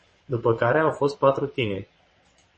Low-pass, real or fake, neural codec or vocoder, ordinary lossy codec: 9.9 kHz; fake; vocoder, 22.05 kHz, 80 mel bands, WaveNeXt; MP3, 32 kbps